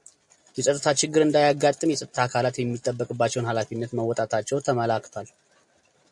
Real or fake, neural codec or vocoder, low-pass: real; none; 10.8 kHz